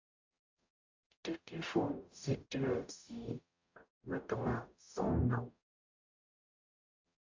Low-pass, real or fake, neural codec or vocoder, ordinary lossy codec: 7.2 kHz; fake; codec, 44.1 kHz, 0.9 kbps, DAC; AAC, 48 kbps